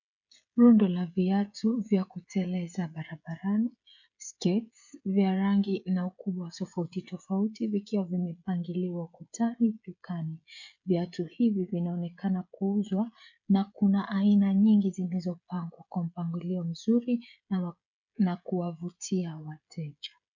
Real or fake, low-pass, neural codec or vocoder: fake; 7.2 kHz; codec, 16 kHz, 16 kbps, FreqCodec, smaller model